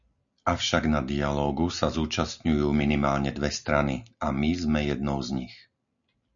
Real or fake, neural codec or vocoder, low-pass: real; none; 7.2 kHz